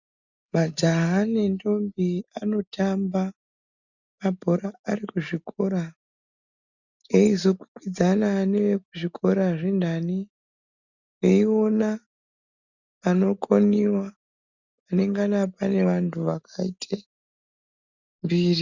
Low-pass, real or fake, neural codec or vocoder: 7.2 kHz; real; none